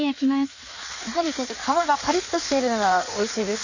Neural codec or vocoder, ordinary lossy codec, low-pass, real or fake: codec, 16 kHz in and 24 kHz out, 1.1 kbps, FireRedTTS-2 codec; none; 7.2 kHz; fake